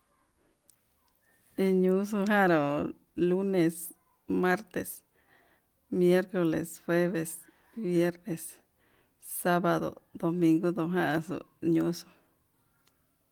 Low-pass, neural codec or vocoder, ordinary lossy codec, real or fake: 19.8 kHz; none; Opus, 24 kbps; real